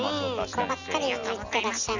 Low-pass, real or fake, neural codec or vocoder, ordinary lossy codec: 7.2 kHz; real; none; none